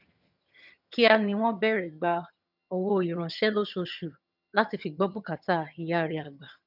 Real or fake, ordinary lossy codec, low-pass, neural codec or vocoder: fake; none; 5.4 kHz; vocoder, 22.05 kHz, 80 mel bands, HiFi-GAN